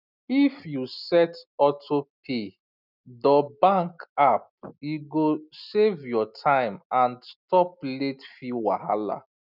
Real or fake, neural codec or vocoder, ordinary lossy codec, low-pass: real; none; none; 5.4 kHz